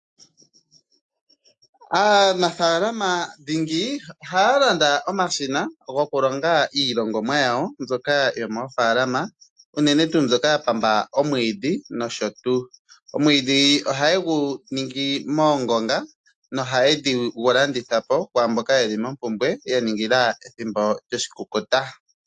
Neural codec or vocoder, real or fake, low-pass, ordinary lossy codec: none; real; 10.8 kHz; AAC, 64 kbps